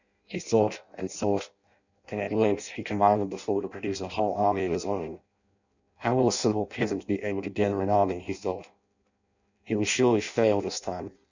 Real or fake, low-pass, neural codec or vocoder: fake; 7.2 kHz; codec, 16 kHz in and 24 kHz out, 0.6 kbps, FireRedTTS-2 codec